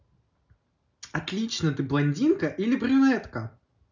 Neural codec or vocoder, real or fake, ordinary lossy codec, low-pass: none; real; none; 7.2 kHz